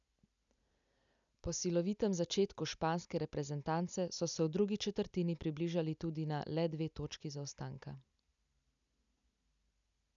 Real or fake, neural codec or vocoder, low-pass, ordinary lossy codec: real; none; 7.2 kHz; MP3, 96 kbps